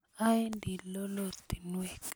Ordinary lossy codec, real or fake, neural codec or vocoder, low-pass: none; real; none; none